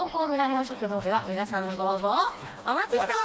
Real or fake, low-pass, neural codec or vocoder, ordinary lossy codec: fake; none; codec, 16 kHz, 1 kbps, FreqCodec, smaller model; none